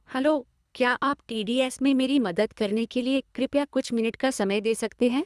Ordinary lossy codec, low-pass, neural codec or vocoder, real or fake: none; none; codec, 24 kHz, 3 kbps, HILCodec; fake